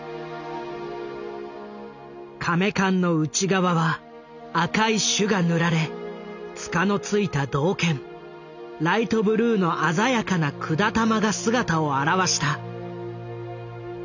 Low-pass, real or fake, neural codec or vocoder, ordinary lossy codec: 7.2 kHz; real; none; none